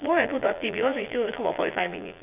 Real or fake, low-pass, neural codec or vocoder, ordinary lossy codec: fake; 3.6 kHz; vocoder, 22.05 kHz, 80 mel bands, Vocos; none